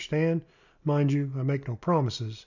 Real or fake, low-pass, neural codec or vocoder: real; 7.2 kHz; none